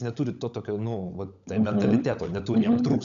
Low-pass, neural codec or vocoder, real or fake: 7.2 kHz; codec, 16 kHz, 16 kbps, FunCodec, trained on LibriTTS, 50 frames a second; fake